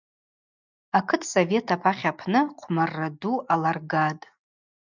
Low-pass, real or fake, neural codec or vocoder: 7.2 kHz; real; none